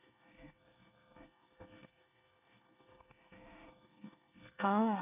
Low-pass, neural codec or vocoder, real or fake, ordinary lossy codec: 3.6 kHz; codec, 24 kHz, 1 kbps, SNAC; fake; none